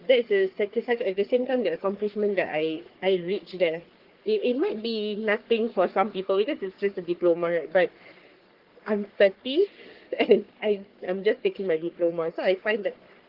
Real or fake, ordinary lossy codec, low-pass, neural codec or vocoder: fake; Opus, 16 kbps; 5.4 kHz; codec, 44.1 kHz, 3.4 kbps, Pupu-Codec